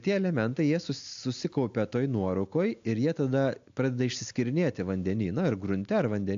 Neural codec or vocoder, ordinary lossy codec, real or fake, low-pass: none; AAC, 64 kbps; real; 7.2 kHz